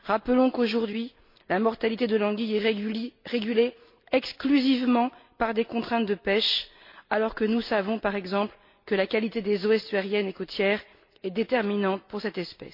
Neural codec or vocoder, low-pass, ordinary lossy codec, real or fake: none; 5.4 kHz; MP3, 32 kbps; real